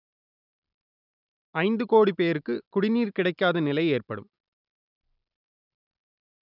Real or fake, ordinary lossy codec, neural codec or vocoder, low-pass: real; none; none; 5.4 kHz